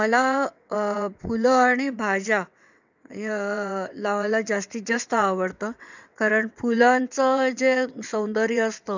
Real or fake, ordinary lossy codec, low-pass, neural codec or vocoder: fake; none; 7.2 kHz; vocoder, 22.05 kHz, 80 mel bands, WaveNeXt